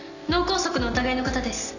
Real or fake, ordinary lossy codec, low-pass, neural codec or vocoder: real; none; 7.2 kHz; none